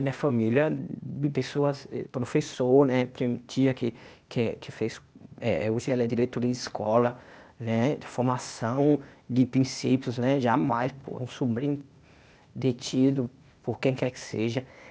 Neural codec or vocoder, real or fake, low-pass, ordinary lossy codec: codec, 16 kHz, 0.8 kbps, ZipCodec; fake; none; none